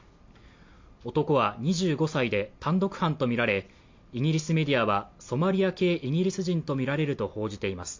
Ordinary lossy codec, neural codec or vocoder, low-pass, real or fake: MP3, 48 kbps; none; 7.2 kHz; real